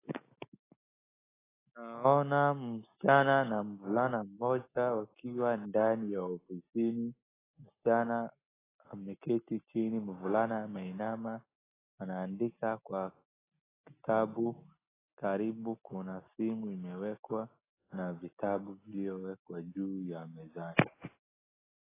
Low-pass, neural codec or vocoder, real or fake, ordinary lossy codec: 3.6 kHz; none; real; AAC, 16 kbps